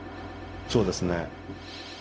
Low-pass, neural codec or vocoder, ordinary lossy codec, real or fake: none; codec, 16 kHz, 0.4 kbps, LongCat-Audio-Codec; none; fake